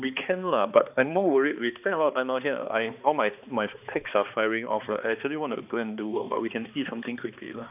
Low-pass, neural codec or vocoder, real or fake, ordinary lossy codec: 3.6 kHz; codec, 16 kHz, 2 kbps, X-Codec, HuBERT features, trained on balanced general audio; fake; none